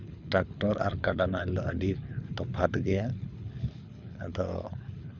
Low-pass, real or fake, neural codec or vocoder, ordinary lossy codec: 7.2 kHz; fake; codec, 24 kHz, 6 kbps, HILCodec; none